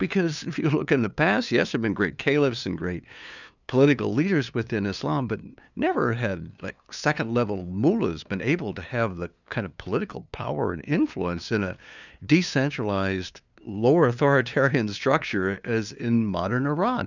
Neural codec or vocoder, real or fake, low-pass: codec, 16 kHz, 2 kbps, FunCodec, trained on LibriTTS, 25 frames a second; fake; 7.2 kHz